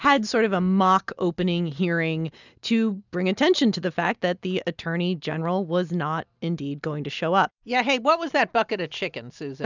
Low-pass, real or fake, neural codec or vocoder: 7.2 kHz; real; none